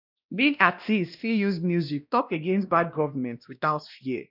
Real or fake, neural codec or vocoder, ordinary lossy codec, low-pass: fake; codec, 16 kHz, 1 kbps, X-Codec, WavLM features, trained on Multilingual LibriSpeech; none; 5.4 kHz